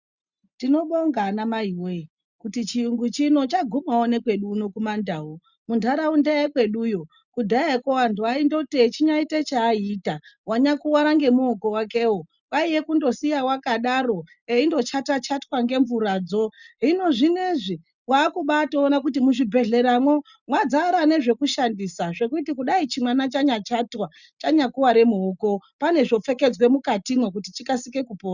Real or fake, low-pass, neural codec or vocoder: real; 7.2 kHz; none